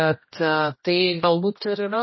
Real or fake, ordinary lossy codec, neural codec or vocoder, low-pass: fake; MP3, 24 kbps; codec, 16 kHz, 1 kbps, X-Codec, HuBERT features, trained on general audio; 7.2 kHz